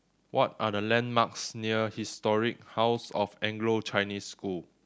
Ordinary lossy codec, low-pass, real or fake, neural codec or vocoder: none; none; real; none